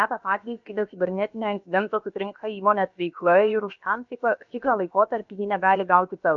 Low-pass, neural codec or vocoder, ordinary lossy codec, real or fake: 7.2 kHz; codec, 16 kHz, about 1 kbps, DyCAST, with the encoder's durations; AAC, 64 kbps; fake